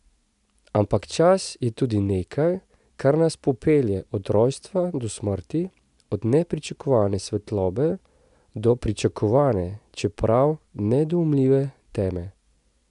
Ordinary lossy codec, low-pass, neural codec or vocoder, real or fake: AAC, 96 kbps; 10.8 kHz; none; real